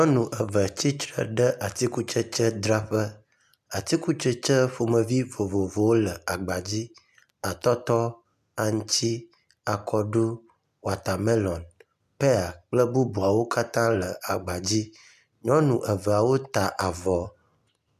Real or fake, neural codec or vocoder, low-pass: real; none; 14.4 kHz